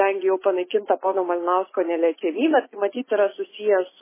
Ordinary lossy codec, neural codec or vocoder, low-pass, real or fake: MP3, 16 kbps; none; 3.6 kHz; real